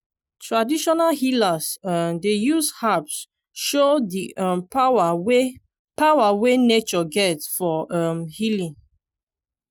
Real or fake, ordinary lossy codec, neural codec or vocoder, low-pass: real; none; none; none